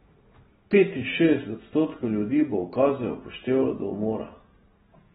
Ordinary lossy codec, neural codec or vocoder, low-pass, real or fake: AAC, 16 kbps; none; 19.8 kHz; real